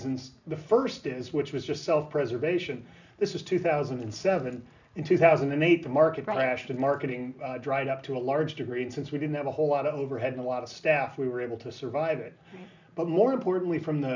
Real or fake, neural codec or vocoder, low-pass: real; none; 7.2 kHz